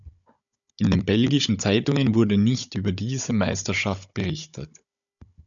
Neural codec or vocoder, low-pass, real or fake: codec, 16 kHz, 16 kbps, FunCodec, trained on Chinese and English, 50 frames a second; 7.2 kHz; fake